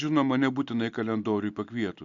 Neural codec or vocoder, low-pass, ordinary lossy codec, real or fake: none; 7.2 kHz; Opus, 64 kbps; real